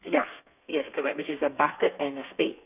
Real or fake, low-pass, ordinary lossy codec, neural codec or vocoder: fake; 3.6 kHz; none; codec, 32 kHz, 1.9 kbps, SNAC